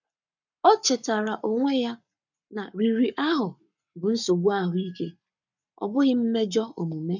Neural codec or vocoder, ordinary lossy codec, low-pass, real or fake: codec, 44.1 kHz, 7.8 kbps, Pupu-Codec; none; 7.2 kHz; fake